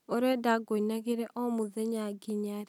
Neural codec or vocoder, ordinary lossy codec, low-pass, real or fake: none; none; 19.8 kHz; real